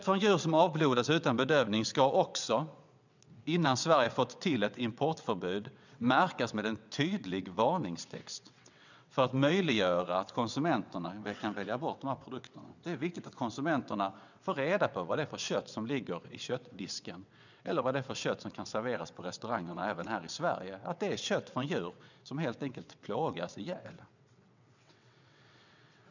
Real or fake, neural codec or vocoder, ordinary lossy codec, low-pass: fake; vocoder, 22.05 kHz, 80 mel bands, WaveNeXt; none; 7.2 kHz